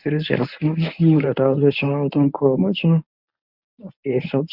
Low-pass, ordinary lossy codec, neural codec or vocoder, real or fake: 5.4 kHz; none; codec, 24 kHz, 0.9 kbps, WavTokenizer, medium speech release version 1; fake